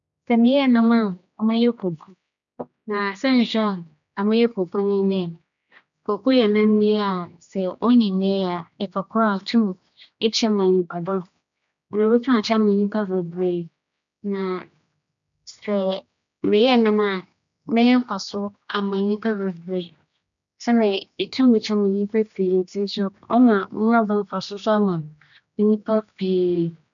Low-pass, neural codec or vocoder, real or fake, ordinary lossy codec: 7.2 kHz; codec, 16 kHz, 2 kbps, X-Codec, HuBERT features, trained on general audio; fake; none